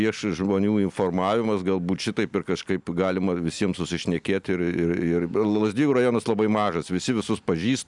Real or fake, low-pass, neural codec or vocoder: real; 10.8 kHz; none